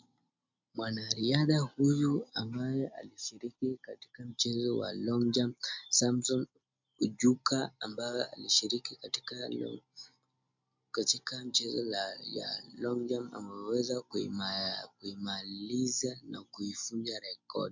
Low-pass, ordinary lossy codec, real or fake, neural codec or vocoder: 7.2 kHz; MP3, 64 kbps; real; none